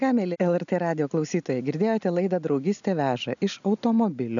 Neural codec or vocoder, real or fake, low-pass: none; real; 7.2 kHz